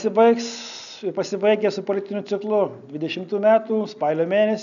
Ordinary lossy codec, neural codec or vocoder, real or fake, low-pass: MP3, 96 kbps; none; real; 7.2 kHz